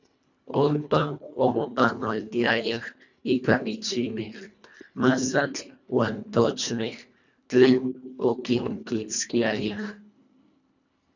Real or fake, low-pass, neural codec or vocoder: fake; 7.2 kHz; codec, 24 kHz, 1.5 kbps, HILCodec